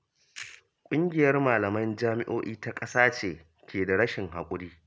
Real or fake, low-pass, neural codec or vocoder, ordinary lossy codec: real; none; none; none